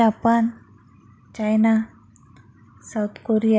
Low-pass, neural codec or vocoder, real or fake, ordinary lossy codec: none; none; real; none